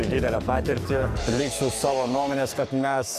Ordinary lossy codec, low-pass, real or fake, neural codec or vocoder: MP3, 96 kbps; 14.4 kHz; fake; codec, 44.1 kHz, 2.6 kbps, SNAC